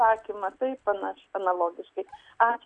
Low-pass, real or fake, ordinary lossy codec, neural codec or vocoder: 9.9 kHz; real; MP3, 64 kbps; none